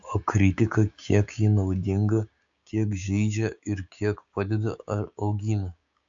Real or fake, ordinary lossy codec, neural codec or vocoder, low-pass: fake; AAC, 64 kbps; codec, 16 kHz, 6 kbps, DAC; 7.2 kHz